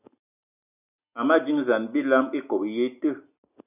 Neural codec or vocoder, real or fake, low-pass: none; real; 3.6 kHz